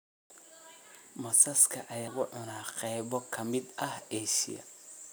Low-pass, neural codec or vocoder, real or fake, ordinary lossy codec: none; none; real; none